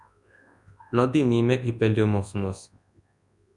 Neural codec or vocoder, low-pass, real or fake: codec, 24 kHz, 0.9 kbps, WavTokenizer, large speech release; 10.8 kHz; fake